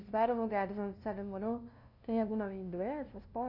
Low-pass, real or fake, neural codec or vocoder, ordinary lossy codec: 5.4 kHz; fake; codec, 16 kHz, 0.5 kbps, FunCodec, trained on LibriTTS, 25 frames a second; none